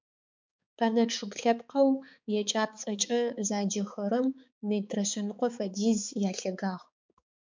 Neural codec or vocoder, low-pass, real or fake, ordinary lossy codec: codec, 16 kHz, 4 kbps, X-Codec, HuBERT features, trained on balanced general audio; 7.2 kHz; fake; MP3, 64 kbps